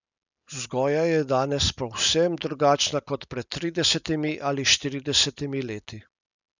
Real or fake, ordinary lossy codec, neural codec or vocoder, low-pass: real; none; none; 7.2 kHz